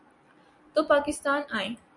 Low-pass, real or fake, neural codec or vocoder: 10.8 kHz; real; none